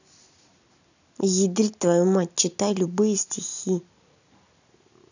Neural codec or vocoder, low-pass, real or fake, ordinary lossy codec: none; 7.2 kHz; real; none